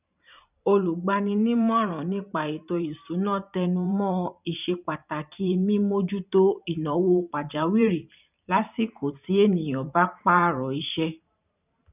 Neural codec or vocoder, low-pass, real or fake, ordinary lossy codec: vocoder, 44.1 kHz, 128 mel bands every 512 samples, BigVGAN v2; 3.6 kHz; fake; none